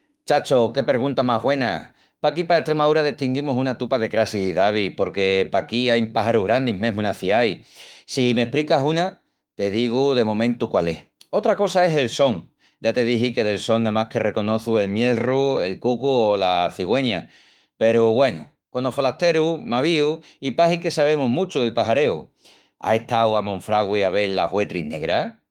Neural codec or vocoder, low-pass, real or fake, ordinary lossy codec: autoencoder, 48 kHz, 32 numbers a frame, DAC-VAE, trained on Japanese speech; 19.8 kHz; fake; Opus, 32 kbps